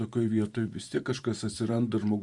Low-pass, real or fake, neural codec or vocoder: 10.8 kHz; fake; vocoder, 44.1 kHz, 128 mel bands every 512 samples, BigVGAN v2